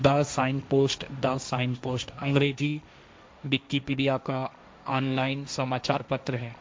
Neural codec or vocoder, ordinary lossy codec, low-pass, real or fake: codec, 16 kHz, 1.1 kbps, Voila-Tokenizer; none; none; fake